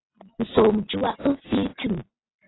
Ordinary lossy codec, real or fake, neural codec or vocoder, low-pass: AAC, 16 kbps; real; none; 7.2 kHz